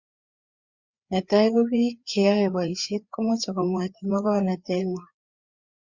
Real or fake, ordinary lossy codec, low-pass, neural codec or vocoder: fake; Opus, 64 kbps; 7.2 kHz; codec, 16 kHz, 4 kbps, FreqCodec, larger model